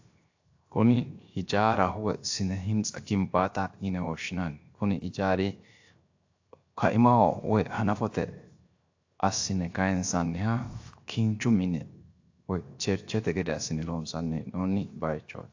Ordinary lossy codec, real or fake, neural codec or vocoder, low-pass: AAC, 48 kbps; fake; codec, 16 kHz, 0.7 kbps, FocalCodec; 7.2 kHz